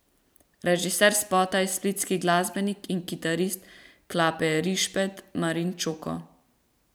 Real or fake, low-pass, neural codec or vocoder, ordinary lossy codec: fake; none; vocoder, 44.1 kHz, 128 mel bands every 512 samples, BigVGAN v2; none